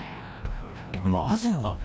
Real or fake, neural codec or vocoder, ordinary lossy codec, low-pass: fake; codec, 16 kHz, 1 kbps, FreqCodec, larger model; none; none